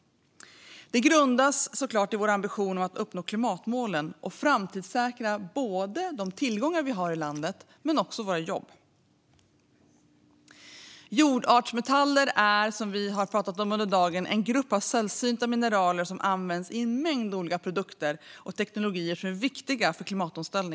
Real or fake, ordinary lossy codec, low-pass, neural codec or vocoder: real; none; none; none